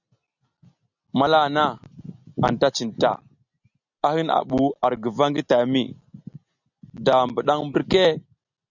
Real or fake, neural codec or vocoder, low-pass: real; none; 7.2 kHz